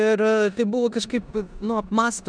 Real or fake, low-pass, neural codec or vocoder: fake; 9.9 kHz; codec, 16 kHz in and 24 kHz out, 0.9 kbps, LongCat-Audio-Codec, four codebook decoder